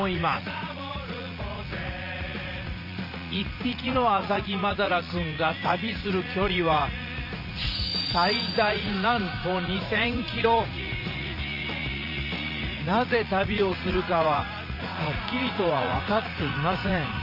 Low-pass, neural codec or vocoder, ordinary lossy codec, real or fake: 5.4 kHz; vocoder, 44.1 kHz, 80 mel bands, Vocos; none; fake